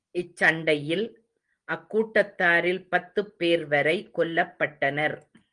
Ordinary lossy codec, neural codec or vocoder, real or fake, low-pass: Opus, 24 kbps; none; real; 9.9 kHz